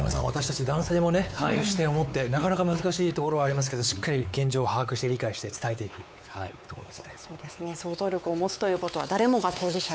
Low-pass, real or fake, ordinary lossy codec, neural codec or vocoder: none; fake; none; codec, 16 kHz, 4 kbps, X-Codec, WavLM features, trained on Multilingual LibriSpeech